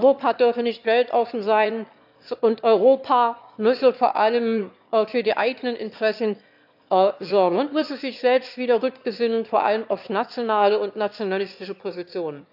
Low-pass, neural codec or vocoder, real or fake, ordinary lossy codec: 5.4 kHz; autoencoder, 22.05 kHz, a latent of 192 numbers a frame, VITS, trained on one speaker; fake; AAC, 48 kbps